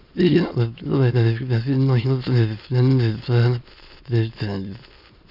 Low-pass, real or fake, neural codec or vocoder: 5.4 kHz; fake; autoencoder, 22.05 kHz, a latent of 192 numbers a frame, VITS, trained on many speakers